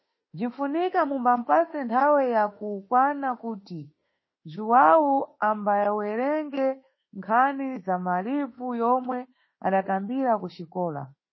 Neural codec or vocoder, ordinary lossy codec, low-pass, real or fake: autoencoder, 48 kHz, 32 numbers a frame, DAC-VAE, trained on Japanese speech; MP3, 24 kbps; 7.2 kHz; fake